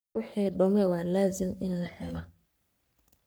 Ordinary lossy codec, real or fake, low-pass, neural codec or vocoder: none; fake; none; codec, 44.1 kHz, 3.4 kbps, Pupu-Codec